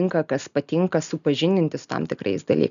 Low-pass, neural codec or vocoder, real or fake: 7.2 kHz; none; real